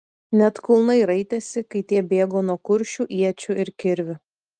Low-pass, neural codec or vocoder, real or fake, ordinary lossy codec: 9.9 kHz; none; real; Opus, 32 kbps